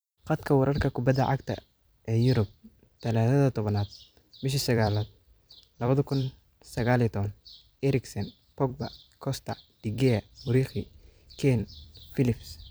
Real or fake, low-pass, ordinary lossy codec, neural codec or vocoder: fake; none; none; vocoder, 44.1 kHz, 128 mel bands every 256 samples, BigVGAN v2